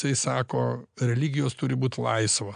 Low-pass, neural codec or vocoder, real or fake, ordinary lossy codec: 9.9 kHz; none; real; AAC, 96 kbps